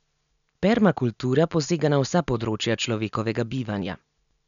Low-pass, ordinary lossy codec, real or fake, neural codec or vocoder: 7.2 kHz; none; real; none